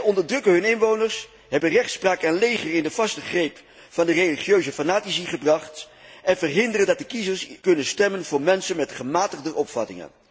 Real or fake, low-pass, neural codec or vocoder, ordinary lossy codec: real; none; none; none